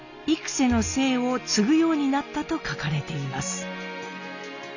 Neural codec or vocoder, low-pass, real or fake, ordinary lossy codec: none; 7.2 kHz; real; none